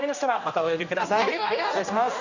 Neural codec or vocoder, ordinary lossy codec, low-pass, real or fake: codec, 16 kHz, 1 kbps, X-Codec, HuBERT features, trained on general audio; none; 7.2 kHz; fake